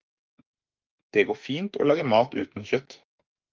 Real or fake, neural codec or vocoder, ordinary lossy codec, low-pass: fake; autoencoder, 48 kHz, 32 numbers a frame, DAC-VAE, trained on Japanese speech; Opus, 24 kbps; 7.2 kHz